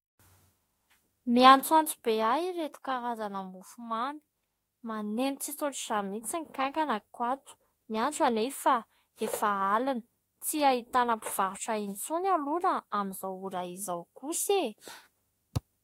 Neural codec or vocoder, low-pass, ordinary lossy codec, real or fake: autoencoder, 48 kHz, 32 numbers a frame, DAC-VAE, trained on Japanese speech; 19.8 kHz; AAC, 48 kbps; fake